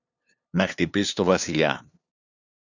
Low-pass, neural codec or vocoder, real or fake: 7.2 kHz; codec, 16 kHz, 2 kbps, FunCodec, trained on LibriTTS, 25 frames a second; fake